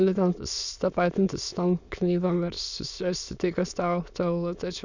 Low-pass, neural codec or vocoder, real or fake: 7.2 kHz; autoencoder, 22.05 kHz, a latent of 192 numbers a frame, VITS, trained on many speakers; fake